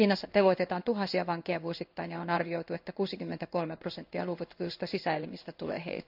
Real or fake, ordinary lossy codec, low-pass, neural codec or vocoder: fake; none; 5.4 kHz; codec, 16 kHz in and 24 kHz out, 1 kbps, XY-Tokenizer